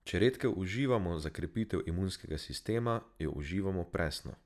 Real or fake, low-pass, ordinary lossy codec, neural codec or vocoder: real; 14.4 kHz; none; none